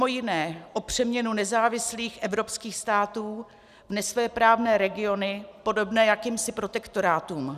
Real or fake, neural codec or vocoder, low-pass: real; none; 14.4 kHz